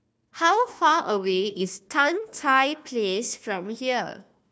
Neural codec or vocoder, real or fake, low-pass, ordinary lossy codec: codec, 16 kHz, 1 kbps, FunCodec, trained on Chinese and English, 50 frames a second; fake; none; none